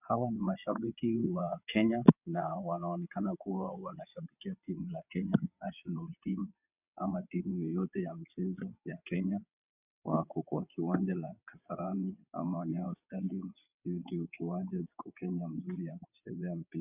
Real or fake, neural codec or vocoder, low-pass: fake; vocoder, 22.05 kHz, 80 mel bands, WaveNeXt; 3.6 kHz